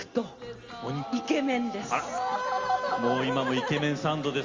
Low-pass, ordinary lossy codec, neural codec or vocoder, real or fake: 7.2 kHz; Opus, 32 kbps; none; real